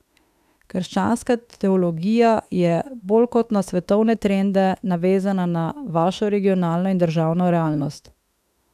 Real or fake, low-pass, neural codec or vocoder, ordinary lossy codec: fake; 14.4 kHz; autoencoder, 48 kHz, 32 numbers a frame, DAC-VAE, trained on Japanese speech; none